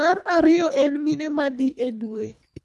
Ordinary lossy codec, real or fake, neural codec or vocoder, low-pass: none; fake; codec, 24 kHz, 1.5 kbps, HILCodec; none